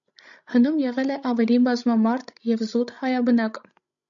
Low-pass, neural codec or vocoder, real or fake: 7.2 kHz; codec, 16 kHz, 16 kbps, FreqCodec, larger model; fake